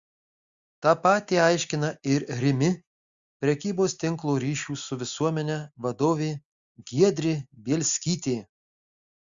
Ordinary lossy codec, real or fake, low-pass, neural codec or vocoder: Opus, 64 kbps; real; 7.2 kHz; none